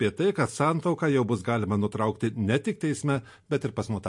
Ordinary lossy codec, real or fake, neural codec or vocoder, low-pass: MP3, 48 kbps; real; none; 10.8 kHz